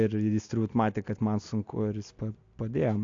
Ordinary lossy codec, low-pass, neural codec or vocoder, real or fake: AAC, 48 kbps; 7.2 kHz; none; real